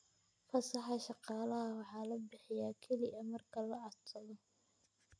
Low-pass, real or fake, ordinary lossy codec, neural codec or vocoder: 9.9 kHz; real; none; none